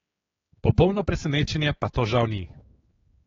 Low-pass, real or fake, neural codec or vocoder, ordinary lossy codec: 7.2 kHz; fake; codec, 16 kHz, 4 kbps, X-Codec, HuBERT features, trained on general audio; AAC, 24 kbps